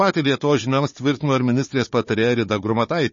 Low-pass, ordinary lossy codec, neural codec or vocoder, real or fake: 7.2 kHz; MP3, 32 kbps; codec, 16 kHz, 4 kbps, FunCodec, trained on Chinese and English, 50 frames a second; fake